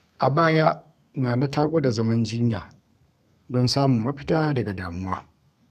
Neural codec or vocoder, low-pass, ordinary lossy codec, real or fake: codec, 32 kHz, 1.9 kbps, SNAC; 14.4 kHz; none; fake